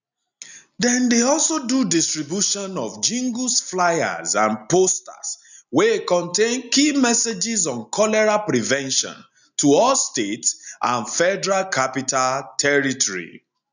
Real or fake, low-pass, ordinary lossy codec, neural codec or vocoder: real; 7.2 kHz; none; none